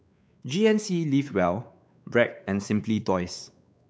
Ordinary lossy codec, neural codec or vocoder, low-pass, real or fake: none; codec, 16 kHz, 4 kbps, X-Codec, WavLM features, trained on Multilingual LibriSpeech; none; fake